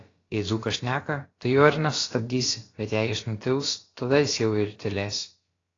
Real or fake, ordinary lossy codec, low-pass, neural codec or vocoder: fake; AAC, 32 kbps; 7.2 kHz; codec, 16 kHz, about 1 kbps, DyCAST, with the encoder's durations